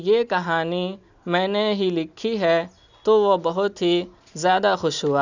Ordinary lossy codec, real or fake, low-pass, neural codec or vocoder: none; real; 7.2 kHz; none